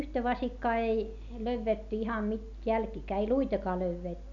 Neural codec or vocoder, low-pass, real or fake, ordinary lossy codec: none; 7.2 kHz; real; none